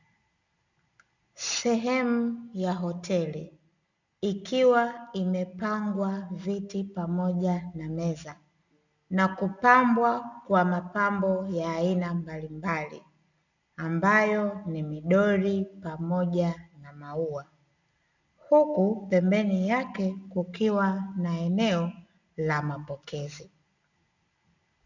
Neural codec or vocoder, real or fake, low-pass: none; real; 7.2 kHz